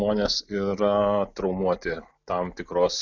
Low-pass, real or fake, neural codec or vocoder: 7.2 kHz; real; none